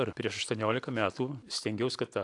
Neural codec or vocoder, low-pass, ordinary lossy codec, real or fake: none; 10.8 kHz; AAC, 64 kbps; real